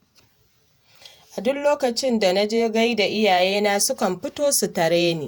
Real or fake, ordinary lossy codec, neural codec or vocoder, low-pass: fake; none; vocoder, 48 kHz, 128 mel bands, Vocos; none